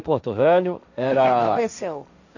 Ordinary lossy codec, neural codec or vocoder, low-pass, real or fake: none; codec, 16 kHz, 1.1 kbps, Voila-Tokenizer; 7.2 kHz; fake